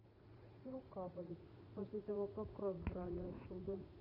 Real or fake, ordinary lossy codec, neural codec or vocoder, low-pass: fake; none; vocoder, 44.1 kHz, 80 mel bands, Vocos; 5.4 kHz